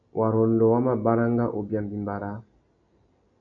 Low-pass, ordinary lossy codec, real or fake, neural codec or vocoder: 7.2 kHz; MP3, 96 kbps; real; none